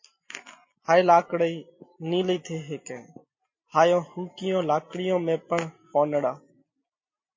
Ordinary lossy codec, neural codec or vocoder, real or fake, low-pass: MP3, 32 kbps; none; real; 7.2 kHz